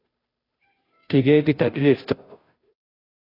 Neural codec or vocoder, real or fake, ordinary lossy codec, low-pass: codec, 16 kHz, 0.5 kbps, FunCodec, trained on Chinese and English, 25 frames a second; fake; AAC, 24 kbps; 5.4 kHz